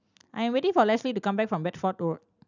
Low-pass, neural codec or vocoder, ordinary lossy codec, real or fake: 7.2 kHz; none; none; real